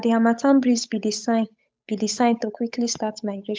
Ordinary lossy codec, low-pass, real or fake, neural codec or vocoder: none; none; fake; codec, 16 kHz, 8 kbps, FunCodec, trained on Chinese and English, 25 frames a second